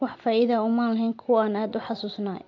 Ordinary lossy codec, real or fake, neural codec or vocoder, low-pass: AAC, 48 kbps; real; none; 7.2 kHz